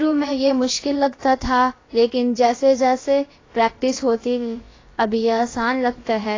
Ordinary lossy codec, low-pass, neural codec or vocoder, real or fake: AAC, 32 kbps; 7.2 kHz; codec, 16 kHz, about 1 kbps, DyCAST, with the encoder's durations; fake